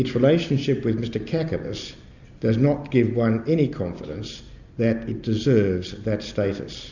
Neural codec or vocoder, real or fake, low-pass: none; real; 7.2 kHz